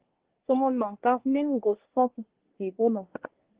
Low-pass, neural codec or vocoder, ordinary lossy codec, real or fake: 3.6 kHz; codec, 24 kHz, 1 kbps, SNAC; Opus, 16 kbps; fake